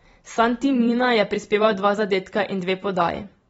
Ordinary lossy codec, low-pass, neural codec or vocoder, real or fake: AAC, 24 kbps; 19.8 kHz; vocoder, 44.1 kHz, 128 mel bands every 256 samples, BigVGAN v2; fake